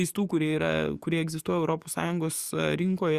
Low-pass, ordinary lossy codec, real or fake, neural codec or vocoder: 14.4 kHz; Opus, 64 kbps; fake; codec, 44.1 kHz, 7.8 kbps, DAC